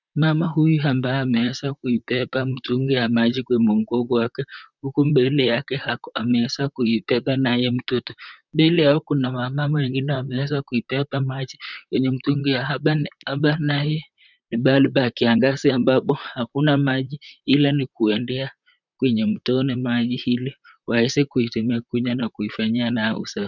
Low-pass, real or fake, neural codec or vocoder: 7.2 kHz; fake; vocoder, 44.1 kHz, 128 mel bands, Pupu-Vocoder